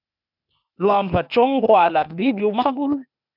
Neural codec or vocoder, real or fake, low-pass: codec, 16 kHz, 0.8 kbps, ZipCodec; fake; 5.4 kHz